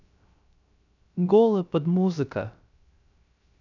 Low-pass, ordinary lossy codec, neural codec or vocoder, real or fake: 7.2 kHz; none; codec, 16 kHz, 0.3 kbps, FocalCodec; fake